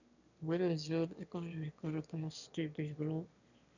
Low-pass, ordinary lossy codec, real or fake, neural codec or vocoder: 7.2 kHz; none; fake; autoencoder, 22.05 kHz, a latent of 192 numbers a frame, VITS, trained on one speaker